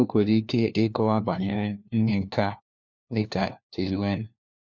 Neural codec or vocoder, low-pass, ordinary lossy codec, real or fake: codec, 16 kHz, 1 kbps, FunCodec, trained on LibriTTS, 50 frames a second; 7.2 kHz; none; fake